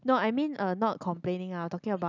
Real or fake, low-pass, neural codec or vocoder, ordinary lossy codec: real; 7.2 kHz; none; none